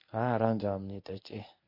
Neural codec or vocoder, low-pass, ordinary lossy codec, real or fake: codec, 24 kHz, 0.9 kbps, WavTokenizer, medium speech release version 1; 5.4 kHz; MP3, 32 kbps; fake